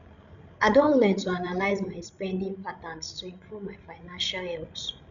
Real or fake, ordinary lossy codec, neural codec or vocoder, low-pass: fake; none; codec, 16 kHz, 16 kbps, FreqCodec, larger model; 7.2 kHz